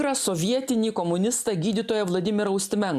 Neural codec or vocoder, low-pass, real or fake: none; 14.4 kHz; real